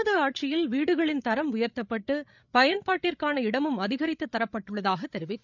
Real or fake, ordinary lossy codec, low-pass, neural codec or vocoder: fake; none; 7.2 kHz; codec, 16 kHz, 8 kbps, FreqCodec, larger model